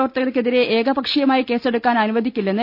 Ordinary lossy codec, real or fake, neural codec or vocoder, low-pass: none; real; none; 5.4 kHz